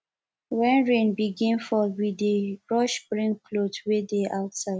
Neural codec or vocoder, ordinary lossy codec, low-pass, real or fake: none; none; none; real